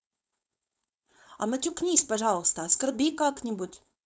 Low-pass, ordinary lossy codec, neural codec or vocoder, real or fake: none; none; codec, 16 kHz, 4.8 kbps, FACodec; fake